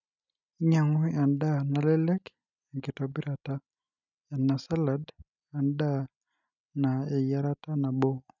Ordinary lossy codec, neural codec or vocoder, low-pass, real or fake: none; none; 7.2 kHz; real